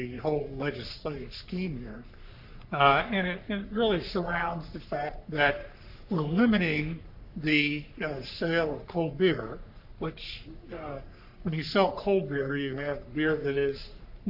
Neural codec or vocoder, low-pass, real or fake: codec, 44.1 kHz, 3.4 kbps, Pupu-Codec; 5.4 kHz; fake